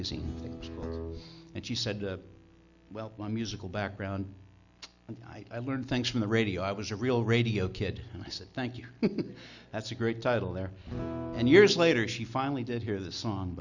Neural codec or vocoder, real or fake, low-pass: none; real; 7.2 kHz